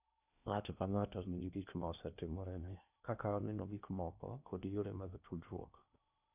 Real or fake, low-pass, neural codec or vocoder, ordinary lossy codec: fake; 3.6 kHz; codec, 16 kHz in and 24 kHz out, 0.8 kbps, FocalCodec, streaming, 65536 codes; none